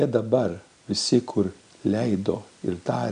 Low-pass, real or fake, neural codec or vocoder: 9.9 kHz; real; none